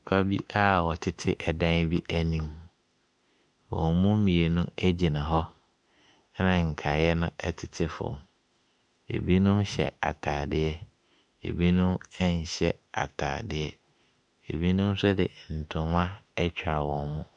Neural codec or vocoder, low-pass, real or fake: autoencoder, 48 kHz, 32 numbers a frame, DAC-VAE, trained on Japanese speech; 10.8 kHz; fake